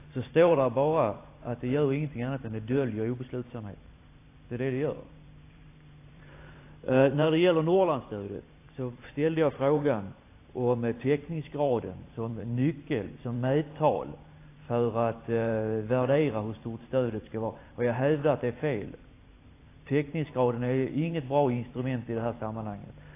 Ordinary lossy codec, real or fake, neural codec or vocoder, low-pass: AAC, 24 kbps; fake; vocoder, 44.1 kHz, 128 mel bands every 512 samples, BigVGAN v2; 3.6 kHz